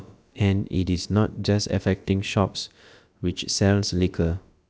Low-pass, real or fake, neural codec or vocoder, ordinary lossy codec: none; fake; codec, 16 kHz, about 1 kbps, DyCAST, with the encoder's durations; none